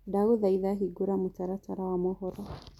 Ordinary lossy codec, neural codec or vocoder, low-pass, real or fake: none; none; 19.8 kHz; real